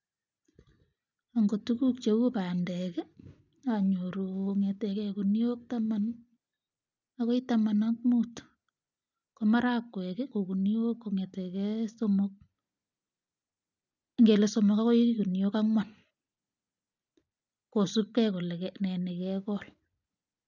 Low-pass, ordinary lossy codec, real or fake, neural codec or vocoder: 7.2 kHz; none; real; none